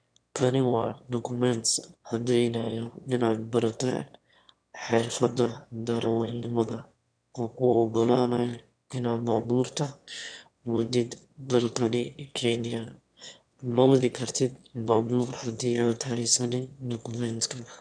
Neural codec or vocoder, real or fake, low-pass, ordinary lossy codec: autoencoder, 22.05 kHz, a latent of 192 numbers a frame, VITS, trained on one speaker; fake; 9.9 kHz; none